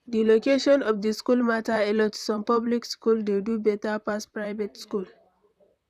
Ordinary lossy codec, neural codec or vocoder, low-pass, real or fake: none; vocoder, 44.1 kHz, 128 mel bands, Pupu-Vocoder; 14.4 kHz; fake